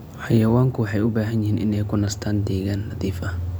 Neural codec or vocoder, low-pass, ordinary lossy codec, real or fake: none; none; none; real